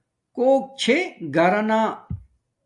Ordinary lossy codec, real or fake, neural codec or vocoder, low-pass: AAC, 64 kbps; real; none; 10.8 kHz